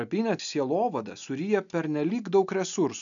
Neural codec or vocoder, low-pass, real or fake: none; 7.2 kHz; real